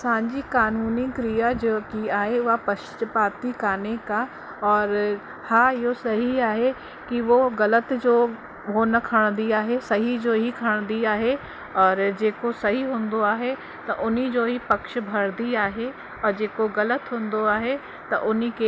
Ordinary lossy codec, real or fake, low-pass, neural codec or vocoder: none; real; none; none